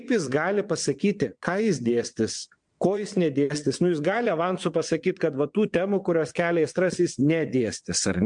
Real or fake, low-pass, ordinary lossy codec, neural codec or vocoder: fake; 9.9 kHz; MP3, 64 kbps; vocoder, 22.05 kHz, 80 mel bands, WaveNeXt